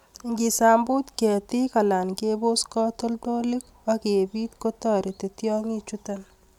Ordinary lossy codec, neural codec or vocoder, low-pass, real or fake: none; none; 19.8 kHz; real